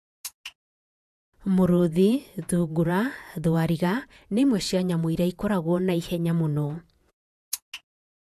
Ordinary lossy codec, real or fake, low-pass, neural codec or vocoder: AAC, 96 kbps; fake; 14.4 kHz; vocoder, 48 kHz, 128 mel bands, Vocos